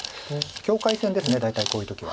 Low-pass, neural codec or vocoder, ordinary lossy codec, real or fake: none; none; none; real